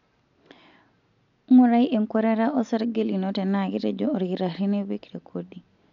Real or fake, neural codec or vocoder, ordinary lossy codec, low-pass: real; none; none; 7.2 kHz